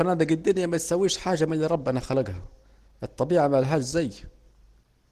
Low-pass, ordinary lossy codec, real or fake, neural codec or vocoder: 14.4 kHz; Opus, 16 kbps; real; none